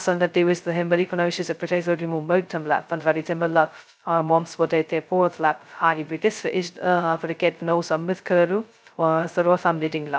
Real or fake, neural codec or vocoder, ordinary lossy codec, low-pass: fake; codec, 16 kHz, 0.2 kbps, FocalCodec; none; none